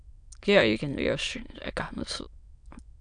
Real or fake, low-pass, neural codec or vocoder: fake; 9.9 kHz; autoencoder, 22.05 kHz, a latent of 192 numbers a frame, VITS, trained on many speakers